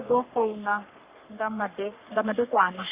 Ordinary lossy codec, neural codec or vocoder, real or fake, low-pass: Opus, 64 kbps; codec, 44.1 kHz, 3.4 kbps, Pupu-Codec; fake; 3.6 kHz